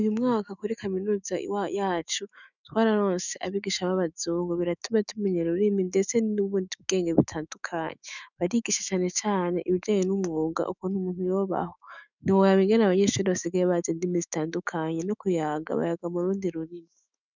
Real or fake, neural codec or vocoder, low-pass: fake; autoencoder, 48 kHz, 128 numbers a frame, DAC-VAE, trained on Japanese speech; 7.2 kHz